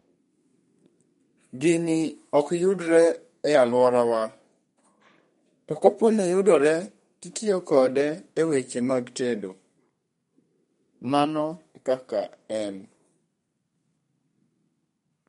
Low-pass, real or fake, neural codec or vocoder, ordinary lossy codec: 14.4 kHz; fake; codec, 32 kHz, 1.9 kbps, SNAC; MP3, 48 kbps